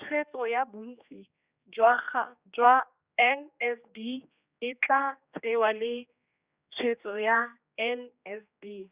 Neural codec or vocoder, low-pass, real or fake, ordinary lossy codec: codec, 16 kHz, 2 kbps, X-Codec, HuBERT features, trained on general audio; 3.6 kHz; fake; Opus, 64 kbps